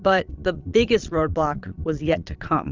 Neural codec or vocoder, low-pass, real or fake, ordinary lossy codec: vocoder, 22.05 kHz, 80 mel bands, Vocos; 7.2 kHz; fake; Opus, 32 kbps